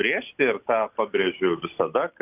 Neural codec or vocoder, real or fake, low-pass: none; real; 3.6 kHz